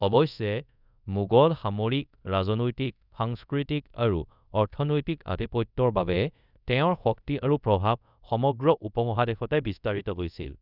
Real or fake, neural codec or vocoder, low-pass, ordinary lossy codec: fake; codec, 24 kHz, 0.5 kbps, DualCodec; 5.4 kHz; none